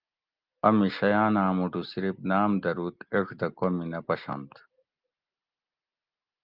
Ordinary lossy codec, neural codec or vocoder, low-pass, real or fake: Opus, 32 kbps; none; 5.4 kHz; real